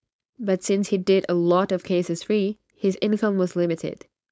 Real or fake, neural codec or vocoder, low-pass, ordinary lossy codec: fake; codec, 16 kHz, 4.8 kbps, FACodec; none; none